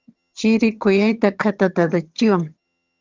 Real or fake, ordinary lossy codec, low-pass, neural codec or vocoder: fake; Opus, 32 kbps; 7.2 kHz; vocoder, 22.05 kHz, 80 mel bands, HiFi-GAN